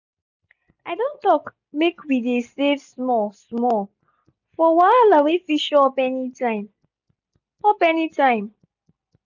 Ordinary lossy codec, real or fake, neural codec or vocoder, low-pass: none; real; none; 7.2 kHz